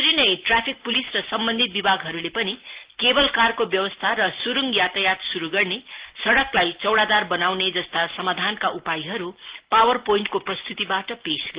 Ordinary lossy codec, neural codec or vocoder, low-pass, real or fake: Opus, 16 kbps; none; 3.6 kHz; real